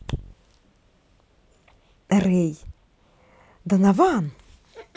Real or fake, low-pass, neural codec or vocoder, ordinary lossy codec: real; none; none; none